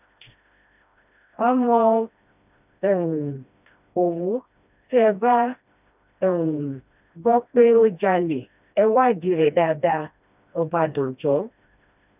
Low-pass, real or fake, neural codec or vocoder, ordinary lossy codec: 3.6 kHz; fake; codec, 16 kHz, 1 kbps, FreqCodec, smaller model; none